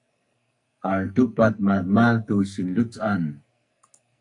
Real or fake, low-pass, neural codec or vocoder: fake; 10.8 kHz; codec, 44.1 kHz, 2.6 kbps, SNAC